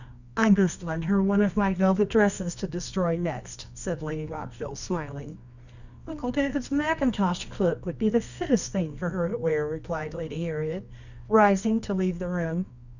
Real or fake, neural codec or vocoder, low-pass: fake; codec, 24 kHz, 0.9 kbps, WavTokenizer, medium music audio release; 7.2 kHz